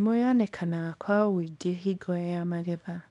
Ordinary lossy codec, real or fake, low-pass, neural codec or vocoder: none; fake; 10.8 kHz; codec, 24 kHz, 0.9 kbps, WavTokenizer, small release